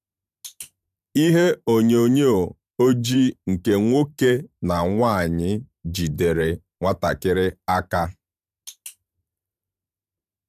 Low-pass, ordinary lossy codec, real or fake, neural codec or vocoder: 14.4 kHz; none; fake; vocoder, 44.1 kHz, 128 mel bands every 512 samples, BigVGAN v2